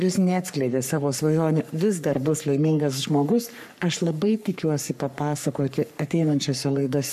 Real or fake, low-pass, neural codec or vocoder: fake; 14.4 kHz; codec, 44.1 kHz, 3.4 kbps, Pupu-Codec